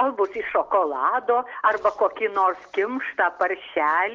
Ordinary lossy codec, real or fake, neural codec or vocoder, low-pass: Opus, 16 kbps; real; none; 7.2 kHz